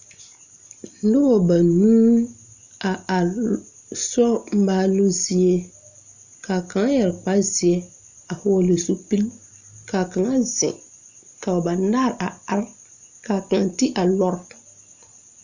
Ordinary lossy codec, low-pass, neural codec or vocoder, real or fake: Opus, 64 kbps; 7.2 kHz; none; real